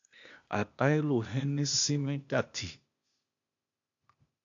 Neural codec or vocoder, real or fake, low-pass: codec, 16 kHz, 0.8 kbps, ZipCodec; fake; 7.2 kHz